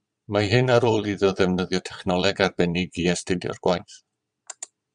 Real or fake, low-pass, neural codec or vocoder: fake; 9.9 kHz; vocoder, 22.05 kHz, 80 mel bands, Vocos